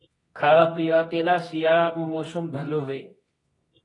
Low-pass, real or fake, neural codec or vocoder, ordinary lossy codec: 10.8 kHz; fake; codec, 24 kHz, 0.9 kbps, WavTokenizer, medium music audio release; AAC, 32 kbps